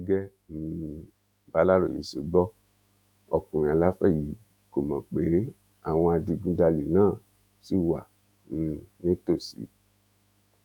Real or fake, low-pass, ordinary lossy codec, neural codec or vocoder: fake; 19.8 kHz; none; autoencoder, 48 kHz, 128 numbers a frame, DAC-VAE, trained on Japanese speech